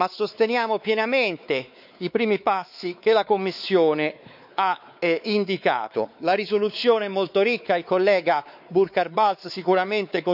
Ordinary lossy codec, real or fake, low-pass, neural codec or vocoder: none; fake; 5.4 kHz; codec, 16 kHz, 4 kbps, X-Codec, WavLM features, trained on Multilingual LibriSpeech